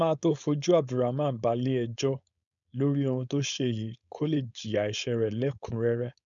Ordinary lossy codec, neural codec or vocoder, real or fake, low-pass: none; codec, 16 kHz, 4.8 kbps, FACodec; fake; 7.2 kHz